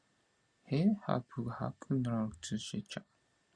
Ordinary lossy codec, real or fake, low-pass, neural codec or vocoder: Opus, 64 kbps; real; 9.9 kHz; none